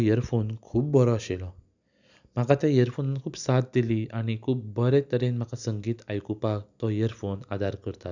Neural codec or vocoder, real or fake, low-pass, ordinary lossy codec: none; real; 7.2 kHz; none